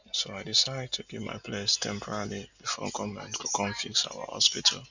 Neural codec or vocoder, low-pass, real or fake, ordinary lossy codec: none; 7.2 kHz; real; none